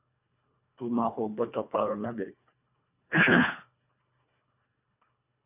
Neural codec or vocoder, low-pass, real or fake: codec, 24 kHz, 1.5 kbps, HILCodec; 3.6 kHz; fake